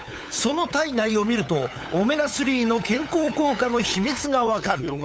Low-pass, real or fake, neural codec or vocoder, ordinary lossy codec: none; fake; codec, 16 kHz, 8 kbps, FunCodec, trained on LibriTTS, 25 frames a second; none